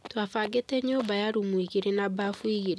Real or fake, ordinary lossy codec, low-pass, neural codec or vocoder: real; none; none; none